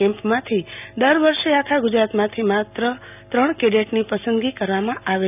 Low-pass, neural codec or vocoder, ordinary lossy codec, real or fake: 3.6 kHz; none; none; real